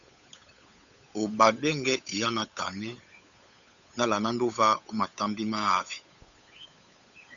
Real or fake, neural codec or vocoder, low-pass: fake; codec, 16 kHz, 8 kbps, FunCodec, trained on Chinese and English, 25 frames a second; 7.2 kHz